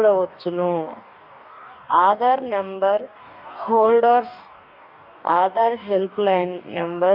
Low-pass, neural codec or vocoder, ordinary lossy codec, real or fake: 5.4 kHz; codec, 44.1 kHz, 2.6 kbps, DAC; none; fake